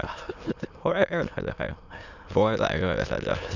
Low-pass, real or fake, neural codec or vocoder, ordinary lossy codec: 7.2 kHz; fake; autoencoder, 22.05 kHz, a latent of 192 numbers a frame, VITS, trained on many speakers; none